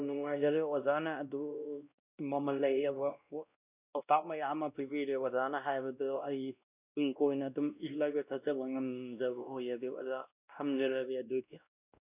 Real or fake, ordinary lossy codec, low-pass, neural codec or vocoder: fake; none; 3.6 kHz; codec, 16 kHz, 1 kbps, X-Codec, WavLM features, trained on Multilingual LibriSpeech